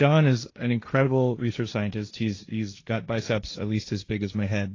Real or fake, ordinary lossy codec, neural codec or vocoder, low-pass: fake; AAC, 32 kbps; codec, 16 kHz, 1.1 kbps, Voila-Tokenizer; 7.2 kHz